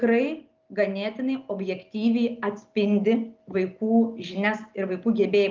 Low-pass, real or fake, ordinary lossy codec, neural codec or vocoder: 7.2 kHz; real; Opus, 32 kbps; none